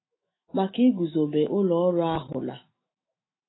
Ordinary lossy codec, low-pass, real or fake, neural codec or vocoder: AAC, 16 kbps; 7.2 kHz; fake; autoencoder, 48 kHz, 128 numbers a frame, DAC-VAE, trained on Japanese speech